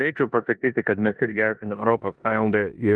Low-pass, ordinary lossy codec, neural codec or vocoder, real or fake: 9.9 kHz; Opus, 32 kbps; codec, 16 kHz in and 24 kHz out, 0.9 kbps, LongCat-Audio-Codec, four codebook decoder; fake